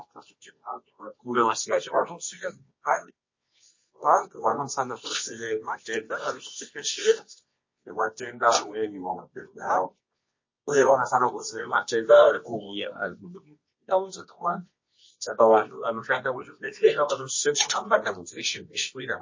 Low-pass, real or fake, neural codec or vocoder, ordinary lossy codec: 7.2 kHz; fake; codec, 24 kHz, 0.9 kbps, WavTokenizer, medium music audio release; MP3, 32 kbps